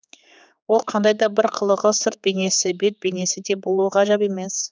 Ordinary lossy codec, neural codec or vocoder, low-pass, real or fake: none; codec, 16 kHz, 4 kbps, X-Codec, HuBERT features, trained on general audio; none; fake